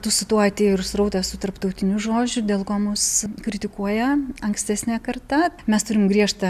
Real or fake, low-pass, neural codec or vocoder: real; 14.4 kHz; none